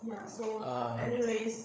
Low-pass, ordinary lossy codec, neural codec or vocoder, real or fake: none; none; codec, 16 kHz, 8 kbps, FreqCodec, larger model; fake